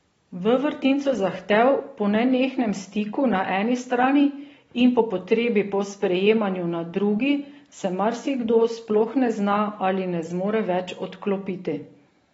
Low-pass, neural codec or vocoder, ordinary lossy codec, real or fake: 14.4 kHz; none; AAC, 24 kbps; real